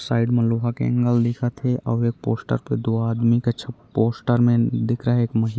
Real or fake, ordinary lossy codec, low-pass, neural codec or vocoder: real; none; none; none